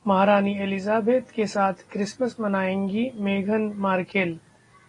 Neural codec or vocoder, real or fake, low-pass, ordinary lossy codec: none; real; 10.8 kHz; AAC, 32 kbps